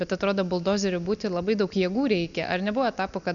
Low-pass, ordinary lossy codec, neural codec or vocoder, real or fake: 7.2 kHz; MP3, 96 kbps; none; real